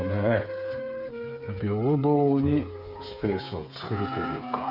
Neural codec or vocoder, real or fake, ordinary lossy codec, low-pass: codec, 16 kHz, 8 kbps, FreqCodec, smaller model; fake; none; 5.4 kHz